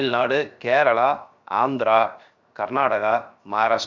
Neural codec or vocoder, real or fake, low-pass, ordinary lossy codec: codec, 16 kHz, 0.7 kbps, FocalCodec; fake; 7.2 kHz; Opus, 64 kbps